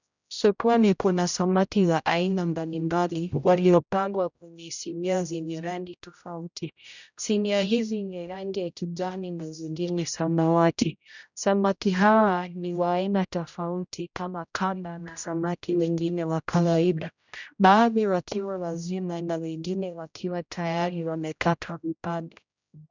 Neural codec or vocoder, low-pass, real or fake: codec, 16 kHz, 0.5 kbps, X-Codec, HuBERT features, trained on general audio; 7.2 kHz; fake